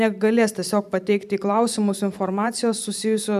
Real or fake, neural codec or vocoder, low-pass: fake; vocoder, 44.1 kHz, 128 mel bands every 512 samples, BigVGAN v2; 14.4 kHz